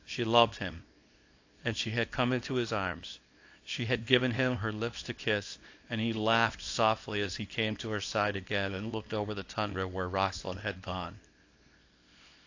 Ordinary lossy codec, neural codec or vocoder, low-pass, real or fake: AAC, 48 kbps; codec, 24 kHz, 0.9 kbps, WavTokenizer, small release; 7.2 kHz; fake